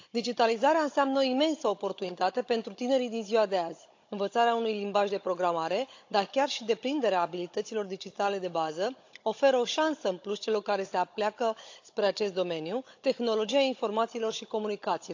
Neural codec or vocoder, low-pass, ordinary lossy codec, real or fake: codec, 16 kHz, 16 kbps, FunCodec, trained on Chinese and English, 50 frames a second; 7.2 kHz; AAC, 48 kbps; fake